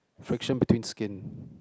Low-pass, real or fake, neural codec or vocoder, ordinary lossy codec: none; real; none; none